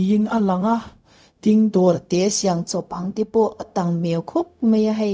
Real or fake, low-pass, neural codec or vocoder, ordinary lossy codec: fake; none; codec, 16 kHz, 0.4 kbps, LongCat-Audio-Codec; none